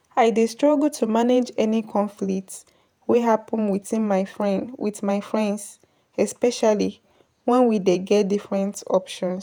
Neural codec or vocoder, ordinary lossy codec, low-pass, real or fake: vocoder, 48 kHz, 128 mel bands, Vocos; none; none; fake